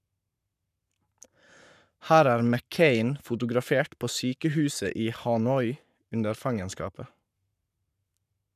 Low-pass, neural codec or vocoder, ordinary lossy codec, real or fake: 14.4 kHz; codec, 44.1 kHz, 7.8 kbps, Pupu-Codec; none; fake